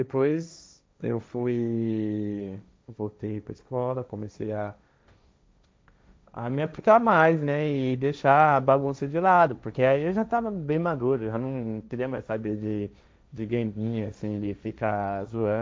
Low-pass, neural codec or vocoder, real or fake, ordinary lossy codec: none; codec, 16 kHz, 1.1 kbps, Voila-Tokenizer; fake; none